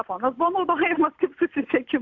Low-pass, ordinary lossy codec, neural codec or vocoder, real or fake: 7.2 kHz; AAC, 48 kbps; none; real